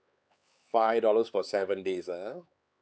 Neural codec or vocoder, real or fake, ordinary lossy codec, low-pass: codec, 16 kHz, 4 kbps, X-Codec, HuBERT features, trained on LibriSpeech; fake; none; none